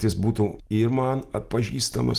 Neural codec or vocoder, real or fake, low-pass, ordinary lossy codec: none; real; 14.4 kHz; Opus, 24 kbps